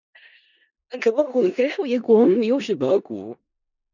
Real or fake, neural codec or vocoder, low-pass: fake; codec, 16 kHz in and 24 kHz out, 0.4 kbps, LongCat-Audio-Codec, four codebook decoder; 7.2 kHz